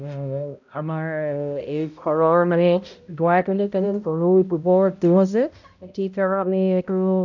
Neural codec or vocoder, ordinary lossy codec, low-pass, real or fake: codec, 16 kHz, 0.5 kbps, X-Codec, HuBERT features, trained on balanced general audio; none; 7.2 kHz; fake